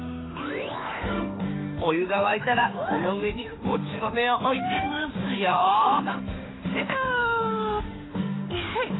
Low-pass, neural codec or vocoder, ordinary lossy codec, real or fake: 7.2 kHz; autoencoder, 48 kHz, 32 numbers a frame, DAC-VAE, trained on Japanese speech; AAC, 16 kbps; fake